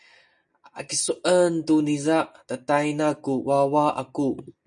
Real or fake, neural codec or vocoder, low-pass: real; none; 9.9 kHz